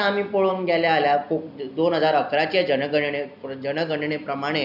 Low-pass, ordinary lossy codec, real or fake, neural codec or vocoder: 5.4 kHz; none; real; none